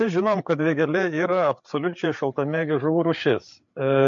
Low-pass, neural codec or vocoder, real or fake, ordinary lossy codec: 7.2 kHz; codec, 16 kHz, 8 kbps, FreqCodec, larger model; fake; MP3, 48 kbps